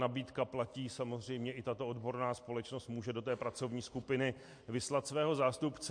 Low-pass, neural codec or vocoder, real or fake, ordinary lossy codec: 9.9 kHz; none; real; MP3, 48 kbps